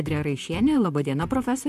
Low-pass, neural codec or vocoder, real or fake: 14.4 kHz; vocoder, 44.1 kHz, 128 mel bands, Pupu-Vocoder; fake